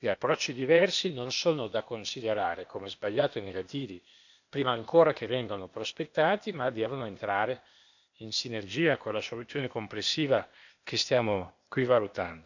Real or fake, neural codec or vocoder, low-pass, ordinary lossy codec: fake; codec, 16 kHz, 0.8 kbps, ZipCodec; 7.2 kHz; none